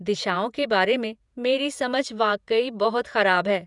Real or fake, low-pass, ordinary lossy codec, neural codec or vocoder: fake; 10.8 kHz; none; vocoder, 48 kHz, 128 mel bands, Vocos